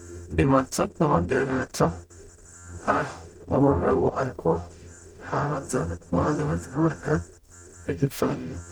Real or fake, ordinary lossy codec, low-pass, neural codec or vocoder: fake; none; 19.8 kHz; codec, 44.1 kHz, 0.9 kbps, DAC